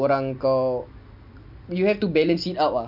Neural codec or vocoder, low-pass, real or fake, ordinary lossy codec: none; 5.4 kHz; real; MP3, 48 kbps